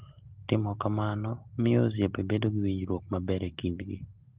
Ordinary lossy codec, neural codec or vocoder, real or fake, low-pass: Opus, 16 kbps; none; real; 3.6 kHz